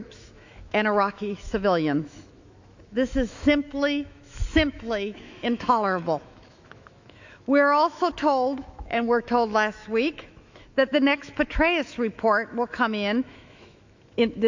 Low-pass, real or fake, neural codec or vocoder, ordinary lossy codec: 7.2 kHz; fake; autoencoder, 48 kHz, 128 numbers a frame, DAC-VAE, trained on Japanese speech; AAC, 48 kbps